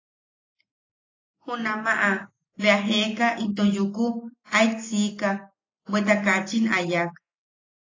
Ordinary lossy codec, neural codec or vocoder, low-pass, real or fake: AAC, 32 kbps; none; 7.2 kHz; real